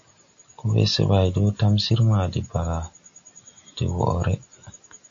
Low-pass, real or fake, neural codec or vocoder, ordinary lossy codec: 7.2 kHz; real; none; AAC, 48 kbps